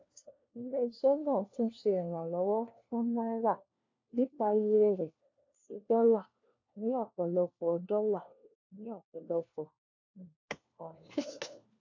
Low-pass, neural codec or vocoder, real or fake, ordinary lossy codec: 7.2 kHz; codec, 16 kHz, 1 kbps, FunCodec, trained on LibriTTS, 50 frames a second; fake; AAC, 48 kbps